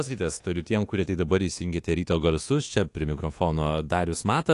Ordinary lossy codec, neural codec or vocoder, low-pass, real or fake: AAC, 48 kbps; codec, 24 kHz, 1.2 kbps, DualCodec; 10.8 kHz; fake